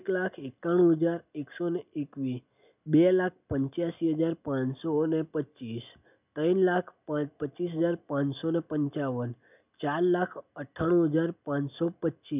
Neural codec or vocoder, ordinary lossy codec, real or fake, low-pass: none; none; real; 3.6 kHz